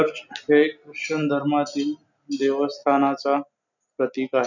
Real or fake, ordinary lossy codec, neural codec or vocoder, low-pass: real; none; none; 7.2 kHz